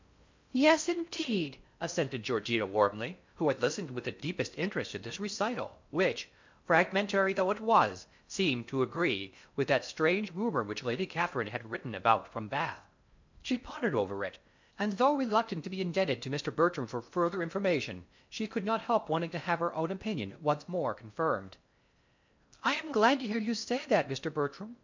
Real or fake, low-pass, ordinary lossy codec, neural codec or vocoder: fake; 7.2 kHz; MP3, 64 kbps; codec, 16 kHz in and 24 kHz out, 0.6 kbps, FocalCodec, streaming, 4096 codes